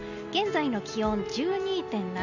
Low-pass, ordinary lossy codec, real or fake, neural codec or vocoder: 7.2 kHz; none; real; none